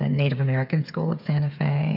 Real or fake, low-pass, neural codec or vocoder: fake; 5.4 kHz; codec, 16 kHz, 8 kbps, FreqCodec, smaller model